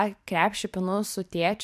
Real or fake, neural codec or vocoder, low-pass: fake; vocoder, 44.1 kHz, 128 mel bands every 256 samples, BigVGAN v2; 14.4 kHz